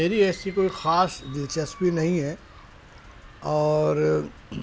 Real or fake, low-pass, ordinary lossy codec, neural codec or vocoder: real; none; none; none